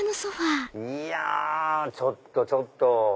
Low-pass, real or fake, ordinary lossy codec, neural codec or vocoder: none; real; none; none